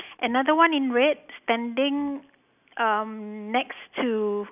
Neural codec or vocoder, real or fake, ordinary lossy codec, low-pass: none; real; none; 3.6 kHz